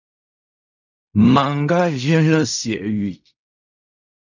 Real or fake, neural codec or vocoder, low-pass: fake; codec, 16 kHz in and 24 kHz out, 0.4 kbps, LongCat-Audio-Codec, fine tuned four codebook decoder; 7.2 kHz